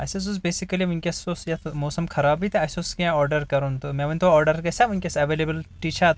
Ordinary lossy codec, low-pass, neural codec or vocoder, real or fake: none; none; none; real